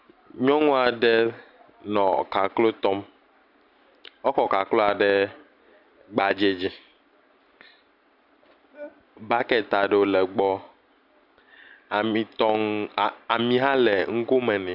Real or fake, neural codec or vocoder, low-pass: real; none; 5.4 kHz